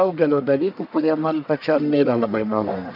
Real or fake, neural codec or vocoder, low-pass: fake; codec, 44.1 kHz, 1.7 kbps, Pupu-Codec; 5.4 kHz